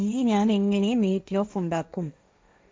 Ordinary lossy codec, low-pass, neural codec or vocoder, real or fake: none; none; codec, 16 kHz, 1.1 kbps, Voila-Tokenizer; fake